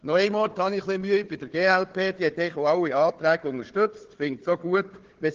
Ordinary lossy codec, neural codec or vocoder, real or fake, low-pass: Opus, 16 kbps; codec, 16 kHz, 4 kbps, FunCodec, trained on Chinese and English, 50 frames a second; fake; 7.2 kHz